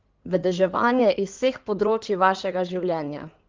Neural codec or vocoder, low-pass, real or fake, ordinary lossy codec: codec, 16 kHz in and 24 kHz out, 2.2 kbps, FireRedTTS-2 codec; 7.2 kHz; fake; Opus, 32 kbps